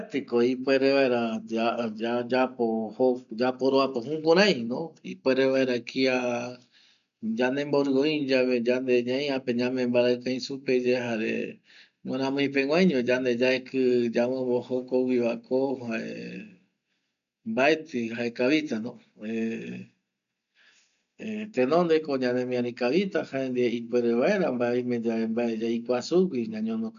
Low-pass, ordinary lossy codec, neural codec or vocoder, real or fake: 7.2 kHz; none; none; real